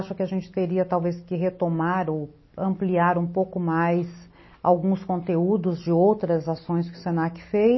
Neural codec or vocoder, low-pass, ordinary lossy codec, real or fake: none; 7.2 kHz; MP3, 24 kbps; real